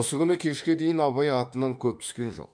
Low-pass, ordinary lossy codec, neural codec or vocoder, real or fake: 9.9 kHz; none; autoencoder, 48 kHz, 32 numbers a frame, DAC-VAE, trained on Japanese speech; fake